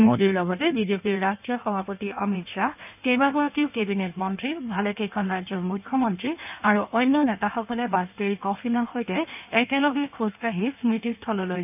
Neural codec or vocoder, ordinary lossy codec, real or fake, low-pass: codec, 16 kHz in and 24 kHz out, 1.1 kbps, FireRedTTS-2 codec; none; fake; 3.6 kHz